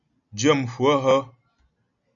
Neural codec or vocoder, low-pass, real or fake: none; 7.2 kHz; real